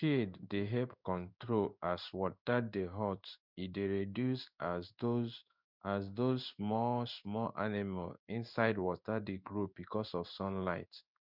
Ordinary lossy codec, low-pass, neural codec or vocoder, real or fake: none; 5.4 kHz; codec, 16 kHz in and 24 kHz out, 1 kbps, XY-Tokenizer; fake